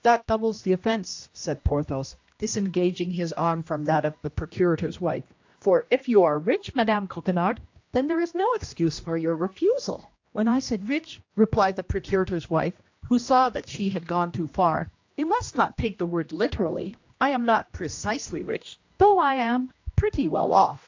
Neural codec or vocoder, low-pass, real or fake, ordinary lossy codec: codec, 16 kHz, 1 kbps, X-Codec, HuBERT features, trained on general audio; 7.2 kHz; fake; AAC, 48 kbps